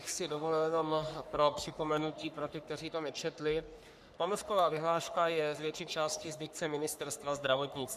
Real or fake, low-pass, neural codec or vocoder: fake; 14.4 kHz; codec, 44.1 kHz, 3.4 kbps, Pupu-Codec